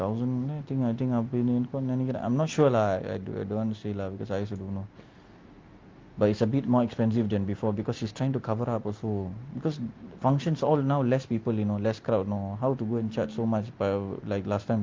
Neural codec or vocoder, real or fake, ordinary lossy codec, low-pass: none; real; Opus, 24 kbps; 7.2 kHz